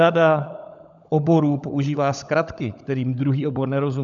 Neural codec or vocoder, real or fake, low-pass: codec, 16 kHz, 16 kbps, FunCodec, trained on LibriTTS, 50 frames a second; fake; 7.2 kHz